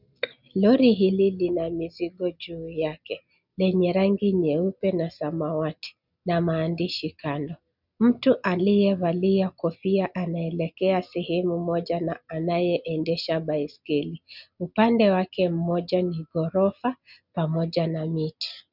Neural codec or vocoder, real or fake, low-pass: none; real; 5.4 kHz